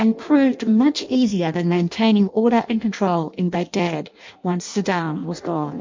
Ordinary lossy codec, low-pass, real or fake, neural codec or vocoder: MP3, 48 kbps; 7.2 kHz; fake; codec, 16 kHz in and 24 kHz out, 0.6 kbps, FireRedTTS-2 codec